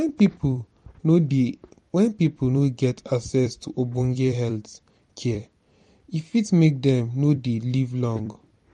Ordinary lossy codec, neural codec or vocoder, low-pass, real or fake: MP3, 48 kbps; vocoder, 44.1 kHz, 128 mel bands every 256 samples, BigVGAN v2; 19.8 kHz; fake